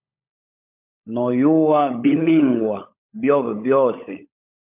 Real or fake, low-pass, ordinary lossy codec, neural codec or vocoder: fake; 3.6 kHz; MP3, 32 kbps; codec, 16 kHz, 16 kbps, FunCodec, trained on LibriTTS, 50 frames a second